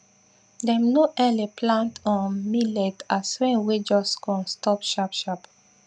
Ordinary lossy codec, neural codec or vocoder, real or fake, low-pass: none; none; real; 9.9 kHz